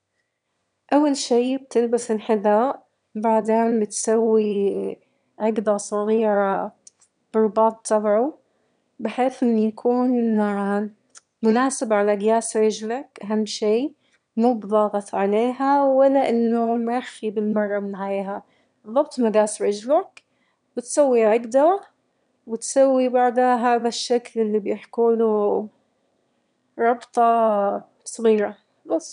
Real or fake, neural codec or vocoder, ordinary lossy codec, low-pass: fake; autoencoder, 22.05 kHz, a latent of 192 numbers a frame, VITS, trained on one speaker; none; 9.9 kHz